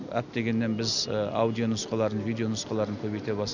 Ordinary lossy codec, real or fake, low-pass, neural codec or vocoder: none; real; 7.2 kHz; none